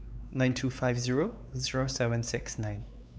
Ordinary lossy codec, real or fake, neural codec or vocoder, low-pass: none; fake; codec, 16 kHz, 4 kbps, X-Codec, WavLM features, trained on Multilingual LibriSpeech; none